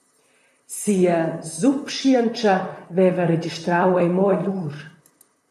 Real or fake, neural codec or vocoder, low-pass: fake; vocoder, 44.1 kHz, 128 mel bands, Pupu-Vocoder; 14.4 kHz